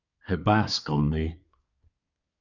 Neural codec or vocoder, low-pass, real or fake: codec, 24 kHz, 1 kbps, SNAC; 7.2 kHz; fake